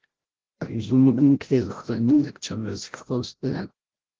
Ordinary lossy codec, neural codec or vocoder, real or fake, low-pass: Opus, 16 kbps; codec, 16 kHz, 0.5 kbps, FreqCodec, larger model; fake; 7.2 kHz